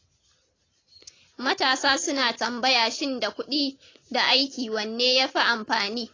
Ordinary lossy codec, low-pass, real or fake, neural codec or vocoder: AAC, 32 kbps; 7.2 kHz; real; none